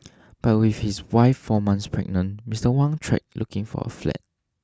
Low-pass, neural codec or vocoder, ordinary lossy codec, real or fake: none; none; none; real